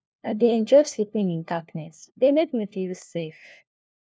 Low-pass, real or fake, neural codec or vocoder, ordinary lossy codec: none; fake; codec, 16 kHz, 1 kbps, FunCodec, trained on LibriTTS, 50 frames a second; none